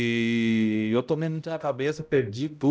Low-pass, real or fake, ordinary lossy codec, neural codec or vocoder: none; fake; none; codec, 16 kHz, 0.5 kbps, X-Codec, HuBERT features, trained on balanced general audio